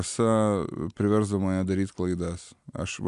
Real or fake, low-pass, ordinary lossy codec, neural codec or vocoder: real; 10.8 kHz; MP3, 96 kbps; none